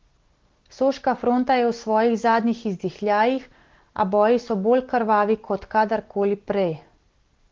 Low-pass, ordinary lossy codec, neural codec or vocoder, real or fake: 7.2 kHz; Opus, 16 kbps; none; real